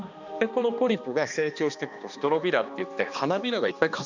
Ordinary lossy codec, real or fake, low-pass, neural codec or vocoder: none; fake; 7.2 kHz; codec, 16 kHz, 2 kbps, X-Codec, HuBERT features, trained on balanced general audio